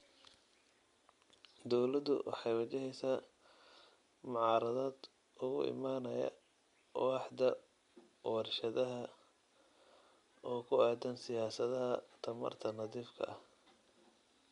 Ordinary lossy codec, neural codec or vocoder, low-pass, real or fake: MP3, 64 kbps; none; 10.8 kHz; real